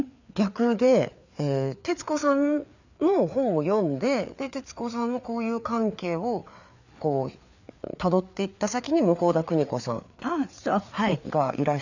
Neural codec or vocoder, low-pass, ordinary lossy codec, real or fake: codec, 16 kHz, 4 kbps, FreqCodec, larger model; 7.2 kHz; none; fake